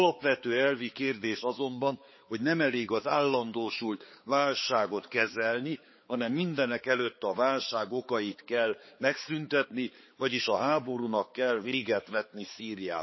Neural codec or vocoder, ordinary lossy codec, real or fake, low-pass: codec, 16 kHz, 4 kbps, X-Codec, HuBERT features, trained on balanced general audio; MP3, 24 kbps; fake; 7.2 kHz